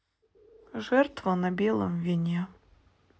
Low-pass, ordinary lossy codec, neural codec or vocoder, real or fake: none; none; none; real